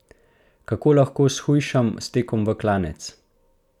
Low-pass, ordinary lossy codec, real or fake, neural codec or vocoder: 19.8 kHz; none; real; none